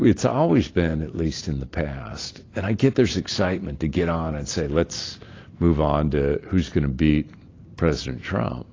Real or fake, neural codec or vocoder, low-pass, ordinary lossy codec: real; none; 7.2 kHz; AAC, 32 kbps